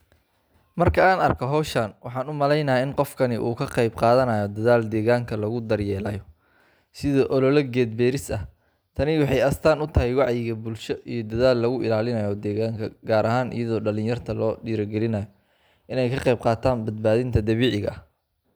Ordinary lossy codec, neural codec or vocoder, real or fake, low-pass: none; none; real; none